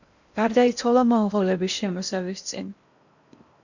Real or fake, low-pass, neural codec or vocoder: fake; 7.2 kHz; codec, 16 kHz in and 24 kHz out, 0.8 kbps, FocalCodec, streaming, 65536 codes